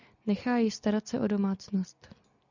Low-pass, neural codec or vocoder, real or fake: 7.2 kHz; none; real